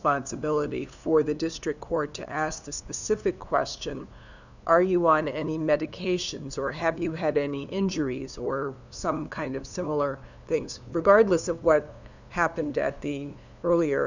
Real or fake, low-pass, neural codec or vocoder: fake; 7.2 kHz; codec, 16 kHz, 2 kbps, FunCodec, trained on LibriTTS, 25 frames a second